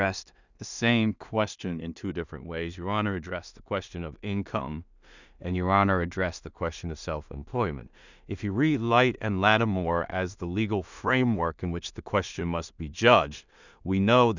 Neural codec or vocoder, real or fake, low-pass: codec, 16 kHz in and 24 kHz out, 0.4 kbps, LongCat-Audio-Codec, two codebook decoder; fake; 7.2 kHz